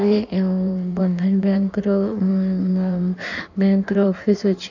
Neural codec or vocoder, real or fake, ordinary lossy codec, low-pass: codec, 16 kHz in and 24 kHz out, 1.1 kbps, FireRedTTS-2 codec; fake; none; 7.2 kHz